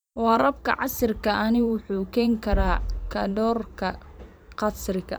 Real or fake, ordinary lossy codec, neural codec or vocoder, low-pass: fake; none; vocoder, 44.1 kHz, 128 mel bands every 512 samples, BigVGAN v2; none